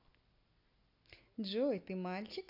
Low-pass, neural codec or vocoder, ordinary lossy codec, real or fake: 5.4 kHz; none; AAC, 32 kbps; real